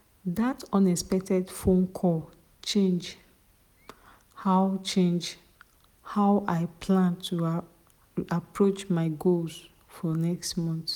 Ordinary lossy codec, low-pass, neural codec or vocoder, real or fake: none; 19.8 kHz; none; real